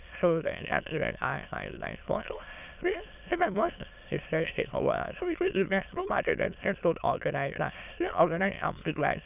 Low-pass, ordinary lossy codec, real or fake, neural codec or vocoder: 3.6 kHz; none; fake; autoencoder, 22.05 kHz, a latent of 192 numbers a frame, VITS, trained on many speakers